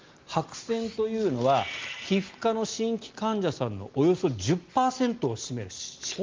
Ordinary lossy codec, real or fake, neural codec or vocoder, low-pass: Opus, 32 kbps; real; none; 7.2 kHz